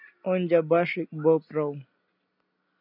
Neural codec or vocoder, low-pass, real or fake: none; 5.4 kHz; real